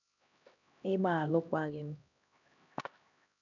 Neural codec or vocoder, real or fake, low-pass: codec, 16 kHz, 1 kbps, X-Codec, HuBERT features, trained on LibriSpeech; fake; 7.2 kHz